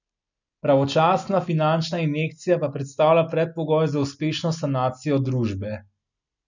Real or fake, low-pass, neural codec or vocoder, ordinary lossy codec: real; 7.2 kHz; none; none